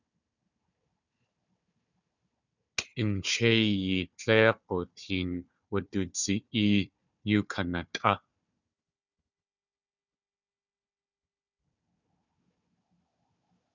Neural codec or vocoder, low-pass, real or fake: codec, 16 kHz, 4 kbps, FunCodec, trained on Chinese and English, 50 frames a second; 7.2 kHz; fake